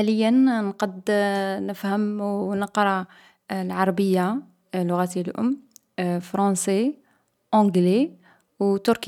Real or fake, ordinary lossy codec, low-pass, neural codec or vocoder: real; none; 19.8 kHz; none